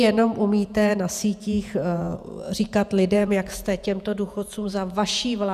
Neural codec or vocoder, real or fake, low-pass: vocoder, 48 kHz, 128 mel bands, Vocos; fake; 14.4 kHz